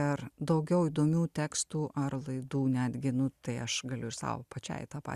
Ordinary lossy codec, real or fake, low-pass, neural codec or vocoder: Opus, 64 kbps; real; 14.4 kHz; none